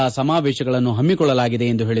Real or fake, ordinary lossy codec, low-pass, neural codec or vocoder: real; none; none; none